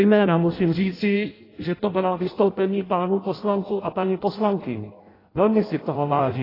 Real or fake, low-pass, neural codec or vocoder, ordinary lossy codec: fake; 5.4 kHz; codec, 16 kHz in and 24 kHz out, 0.6 kbps, FireRedTTS-2 codec; AAC, 24 kbps